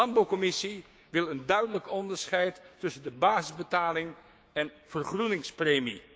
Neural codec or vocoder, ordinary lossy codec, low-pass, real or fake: codec, 16 kHz, 6 kbps, DAC; none; none; fake